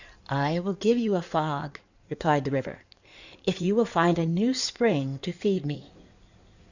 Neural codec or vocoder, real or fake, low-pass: codec, 16 kHz in and 24 kHz out, 2.2 kbps, FireRedTTS-2 codec; fake; 7.2 kHz